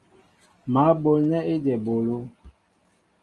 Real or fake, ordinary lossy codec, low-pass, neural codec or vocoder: real; Opus, 32 kbps; 10.8 kHz; none